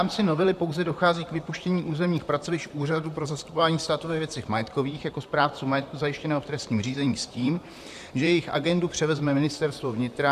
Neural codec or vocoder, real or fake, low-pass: vocoder, 44.1 kHz, 128 mel bands, Pupu-Vocoder; fake; 14.4 kHz